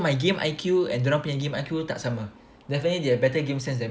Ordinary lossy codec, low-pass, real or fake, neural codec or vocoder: none; none; real; none